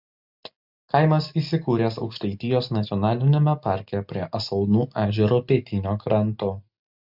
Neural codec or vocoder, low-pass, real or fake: none; 5.4 kHz; real